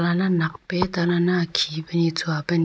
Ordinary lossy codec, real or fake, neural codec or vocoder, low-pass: none; real; none; none